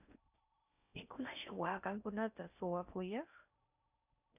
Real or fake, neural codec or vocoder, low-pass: fake; codec, 16 kHz in and 24 kHz out, 0.6 kbps, FocalCodec, streaming, 4096 codes; 3.6 kHz